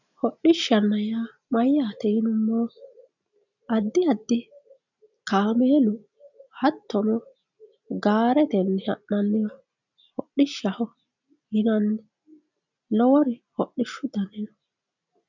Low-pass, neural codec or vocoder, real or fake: 7.2 kHz; none; real